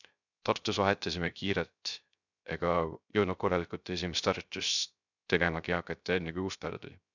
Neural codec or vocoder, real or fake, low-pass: codec, 16 kHz, 0.3 kbps, FocalCodec; fake; 7.2 kHz